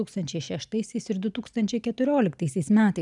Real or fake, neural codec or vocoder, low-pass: real; none; 10.8 kHz